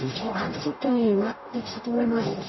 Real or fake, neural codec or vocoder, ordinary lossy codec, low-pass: fake; codec, 44.1 kHz, 0.9 kbps, DAC; MP3, 24 kbps; 7.2 kHz